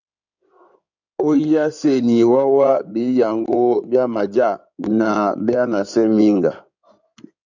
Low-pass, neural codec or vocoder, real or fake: 7.2 kHz; codec, 16 kHz in and 24 kHz out, 2.2 kbps, FireRedTTS-2 codec; fake